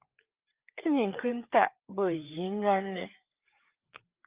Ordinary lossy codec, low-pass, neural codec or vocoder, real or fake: Opus, 32 kbps; 3.6 kHz; codec, 16 kHz, 4 kbps, FreqCodec, larger model; fake